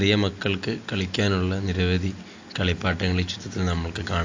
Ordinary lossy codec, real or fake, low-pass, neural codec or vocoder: AAC, 48 kbps; real; 7.2 kHz; none